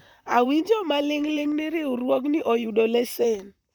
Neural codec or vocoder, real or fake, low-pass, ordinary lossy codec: vocoder, 44.1 kHz, 128 mel bands, Pupu-Vocoder; fake; 19.8 kHz; none